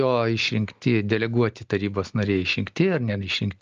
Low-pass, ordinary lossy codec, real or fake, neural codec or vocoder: 7.2 kHz; Opus, 24 kbps; real; none